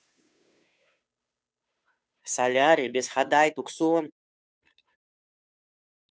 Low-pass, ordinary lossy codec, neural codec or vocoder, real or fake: none; none; codec, 16 kHz, 2 kbps, FunCodec, trained on Chinese and English, 25 frames a second; fake